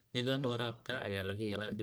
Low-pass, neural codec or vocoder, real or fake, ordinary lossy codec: none; codec, 44.1 kHz, 1.7 kbps, Pupu-Codec; fake; none